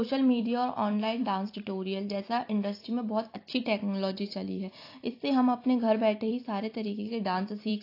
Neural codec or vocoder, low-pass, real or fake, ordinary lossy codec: vocoder, 44.1 kHz, 128 mel bands every 256 samples, BigVGAN v2; 5.4 kHz; fake; AAC, 32 kbps